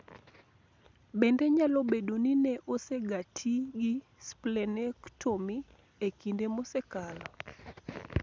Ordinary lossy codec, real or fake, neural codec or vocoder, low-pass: none; real; none; none